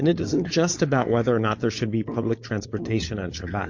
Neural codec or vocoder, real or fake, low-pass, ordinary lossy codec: codec, 16 kHz, 4.8 kbps, FACodec; fake; 7.2 kHz; MP3, 48 kbps